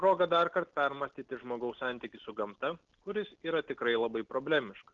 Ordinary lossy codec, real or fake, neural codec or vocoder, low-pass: Opus, 16 kbps; real; none; 7.2 kHz